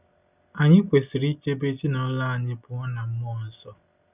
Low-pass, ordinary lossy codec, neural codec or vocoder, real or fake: 3.6 kHz; none; none; real